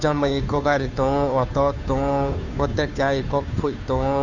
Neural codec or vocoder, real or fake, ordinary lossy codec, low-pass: codec, 16 kHz, 2 kbps, FunCodec, trained on Chinese and English, 25 frames a second; fake; none; 7.2 kHz